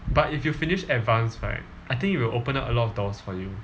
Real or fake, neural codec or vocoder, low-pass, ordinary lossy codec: real; none; none; none